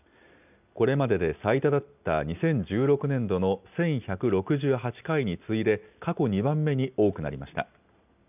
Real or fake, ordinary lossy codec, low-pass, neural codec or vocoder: real; none; 3.6 kHz; none